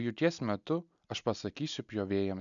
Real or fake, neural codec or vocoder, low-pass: real; none; 7.2 kHz